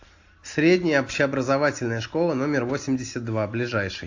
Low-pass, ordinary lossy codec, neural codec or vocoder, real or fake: 7.2 kHz; AAC, 48 kbps; none; real